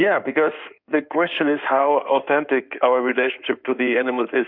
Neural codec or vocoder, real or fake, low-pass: codec, 16 kHz in and 24 kHz out, 2.2 kbps, FireRedTTS-2 codec; fake; 5.4 kHz